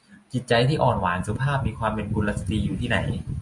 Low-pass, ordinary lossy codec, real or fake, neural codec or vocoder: 10.8 kHz; MP3, 64 kbps; real; none